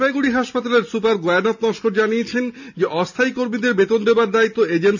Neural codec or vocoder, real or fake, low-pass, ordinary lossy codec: none; real; 7.2 kHz; none